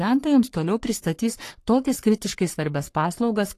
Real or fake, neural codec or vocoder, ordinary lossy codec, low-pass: fake; codec, 44.1 kHz, 3.4 kbps, Pupu-Codec; AAC, 48 kbps; 14.4 kHz